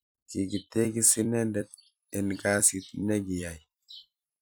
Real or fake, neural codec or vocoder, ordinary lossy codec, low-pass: fake; vocoder, 44.1 kHz, 128 mel bands every 256 samples, BigVGAN v2; none; none